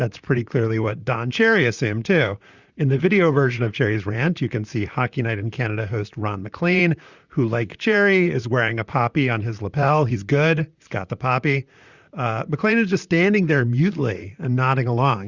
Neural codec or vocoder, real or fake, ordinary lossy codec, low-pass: vocoder, 44.1 kHz, 128 mel bands, Pupu-Vocoder; fake; Opus, 64 kbps; 7.2 kHz